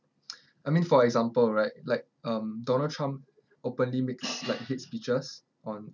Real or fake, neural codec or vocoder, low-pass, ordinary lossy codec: real; none; 7.2 kHz; none